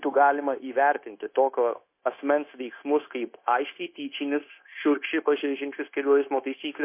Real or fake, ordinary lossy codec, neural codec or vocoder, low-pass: fake; MP3, 24 kbps; codec, 16 kHz, 0.9 kbps, LongCat-Audio-Codec; 3.6 kHz